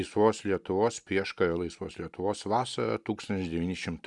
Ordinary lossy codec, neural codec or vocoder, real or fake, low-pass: Opus, 64 kbps; none; real; 10.8 kHz